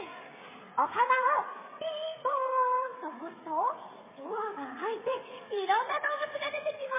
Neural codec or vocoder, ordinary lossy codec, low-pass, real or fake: codec, 16 kHz, 8 kbps, FreqCodec, smaller model; MP3, 16 kbps; 3.6 kHz; fake